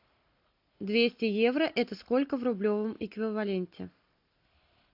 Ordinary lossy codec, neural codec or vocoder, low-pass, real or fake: AAC, 48 kbps; none; 5.4 kHz; real